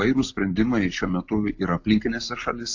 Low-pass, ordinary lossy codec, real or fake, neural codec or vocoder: 7.2 kHz; AAC, 48 kbps; real; none